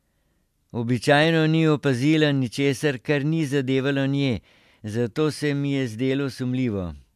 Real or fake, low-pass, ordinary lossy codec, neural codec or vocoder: real; 14.4 kHz; AAC, 96 kbps; none